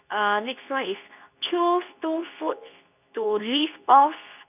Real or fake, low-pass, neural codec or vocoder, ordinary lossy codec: fake; 3.6 kHz; codec, 24 kHz, 0.9 kbps, WavTokenizer, medium speech release version 2; none